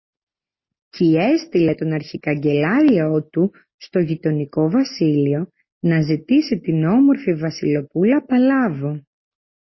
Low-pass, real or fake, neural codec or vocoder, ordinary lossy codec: 7.2 kHz; real; none; MP3, 24 kbps